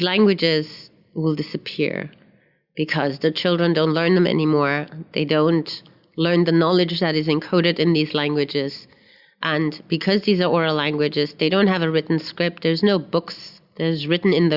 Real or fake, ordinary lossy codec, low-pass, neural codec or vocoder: real; Opus, 64 kbps; 5.4 kHz; none